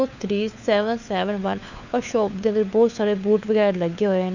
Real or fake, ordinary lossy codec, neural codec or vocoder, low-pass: fake; none; codec, 16 kHz, 4 kbps, FunCodec, trained on LibriTTS, 50 frames a second; 7.2 kHz